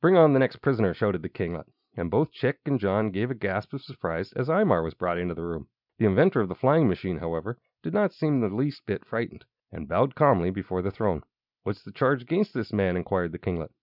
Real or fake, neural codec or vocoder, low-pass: real; none; 5.4 kHz